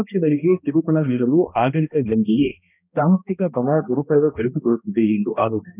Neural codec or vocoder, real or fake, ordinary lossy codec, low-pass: codec, 16 kHz, 1 kbps, X-Codec, HuBERT features, trained on balanced general audio; fake; none; 3.6 kHz